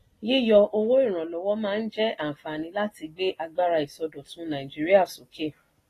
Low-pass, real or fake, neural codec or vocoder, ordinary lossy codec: 14.4 kHz; real; none; AAC, 48 kbps